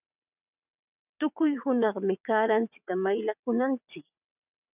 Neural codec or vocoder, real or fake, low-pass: vocoder, 22.05 kHz, 80 mel bands, WaveNeXt; fake; 3.6 kHz